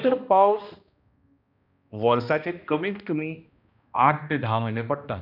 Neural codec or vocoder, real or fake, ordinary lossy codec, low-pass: codec, 16 kHz, 1 kbps, X-Codec, HuBERT features, trained on balanced general audio; fake; none; 5.4 kHz